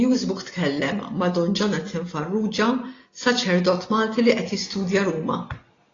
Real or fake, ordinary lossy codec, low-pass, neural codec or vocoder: real; AAC, 32 kbps; 7.2 kHz; none